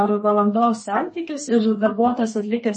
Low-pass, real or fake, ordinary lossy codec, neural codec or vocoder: 10.8 kHz; fake; MP3, 32 kbps; codec, 32 kHz, 1.9 kbps, SNAC